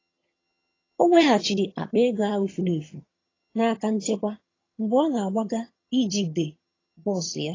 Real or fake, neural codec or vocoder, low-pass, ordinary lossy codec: fake; vocoder, 22.05 kHz, 80 mel bands, HiFi-GAN; 7.2 kHz; AAC, 32 kbps